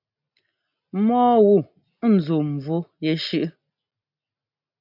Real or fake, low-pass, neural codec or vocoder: real; 5.4 kHz; none